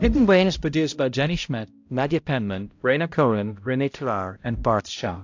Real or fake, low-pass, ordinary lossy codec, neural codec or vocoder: fake; 7.2 kHz; AAC, 48 kbps; codec, 16 kHz, 0.5 kbps, X-Codec, HuBERT features, trained on balanced general audio